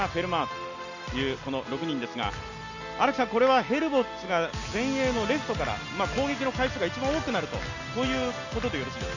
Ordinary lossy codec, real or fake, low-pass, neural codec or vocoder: none; real; 7.2 kHz; none